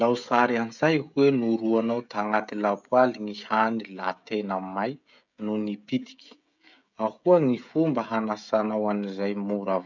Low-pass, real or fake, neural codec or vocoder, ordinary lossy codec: 7.2 kHz; fake; codec, 16 kHz, 16 kbps, FreqCodec, smaller model; none